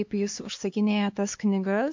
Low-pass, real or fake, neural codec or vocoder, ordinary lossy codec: 7.2 kHz; fake; codec, 16 kHz, 4 kbps, X-Codec, HuBERT features, trained on LibriSpeech; MP3, 48 kbps